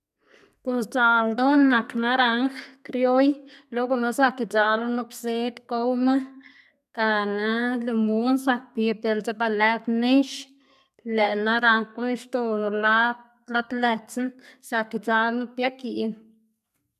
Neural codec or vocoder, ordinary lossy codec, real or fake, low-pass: codec, 32 kHz, 1.9 kbps, SNAC; none; fake; 14.4 kHz